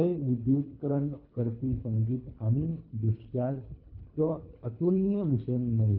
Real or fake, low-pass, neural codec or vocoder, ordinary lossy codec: fake; 5.4 kHz; codec, 24 kHz, 3 kbps, HILCodec; none